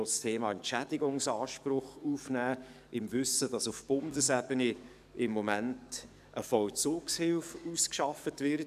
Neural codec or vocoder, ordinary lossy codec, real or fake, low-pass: codec, 44.1 kHz, 7.8 kbps, DAC; none; fake; 14.4 kHz